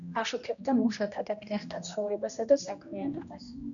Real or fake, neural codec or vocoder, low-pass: fake; codec, 16 kHz, 1 kbps, X-Codec, HuBERT features, trained on general audio; 7.2 kHz